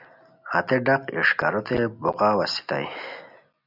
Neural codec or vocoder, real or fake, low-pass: none; real; 5.4 kHz